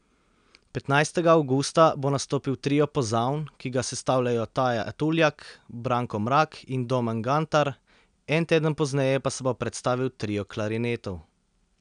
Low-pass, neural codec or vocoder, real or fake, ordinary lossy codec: 9.9 kHz; none; real; none